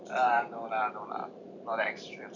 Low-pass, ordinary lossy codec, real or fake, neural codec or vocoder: 7.2 kHz; none; fake; vocoder, 44.1 kHz, 128 mel bands every 512 samples, BigVGAN v2